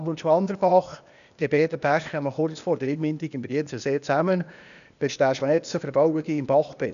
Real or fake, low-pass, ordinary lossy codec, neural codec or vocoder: fake; 7.2 kHz; none; codec, 16 kHz, 0.8 kbps, ZipCodec